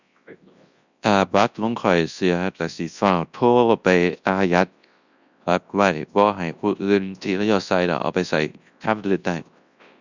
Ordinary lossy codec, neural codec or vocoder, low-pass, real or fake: Opus, 64 kbps; codec, 24 kHz, 0.9 kbps, WavTokenizer, large speech release; 7.2 kHz; fake